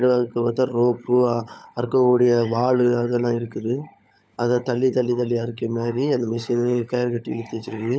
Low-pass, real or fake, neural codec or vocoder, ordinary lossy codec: none; fake; codec, 16 kHz, 16 kbps, FunCodec, trained on LibriTTS, 50 frames a second; none